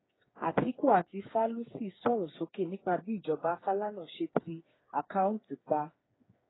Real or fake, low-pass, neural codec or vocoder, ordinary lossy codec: fake; 7.2 kHz; codec, 16 kHz, 4 kbps, FreqCodec, smaller model; AAC, 16 kbps